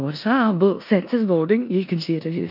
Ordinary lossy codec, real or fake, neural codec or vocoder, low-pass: AAC, 48 kbps; fake; codec, 16 kHz in and 24 kHz out, 0.9 kbps, LongCat-Audio-Codec, four codebook decoder; 5.4 kHz